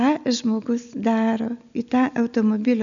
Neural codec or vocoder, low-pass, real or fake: none; 7.2 kHz; real